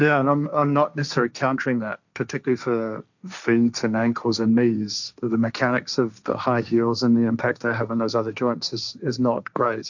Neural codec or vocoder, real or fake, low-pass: codec, 16 kHz, 1.1 kbps, Voila-Tokenizer; fake; 7.2 kHz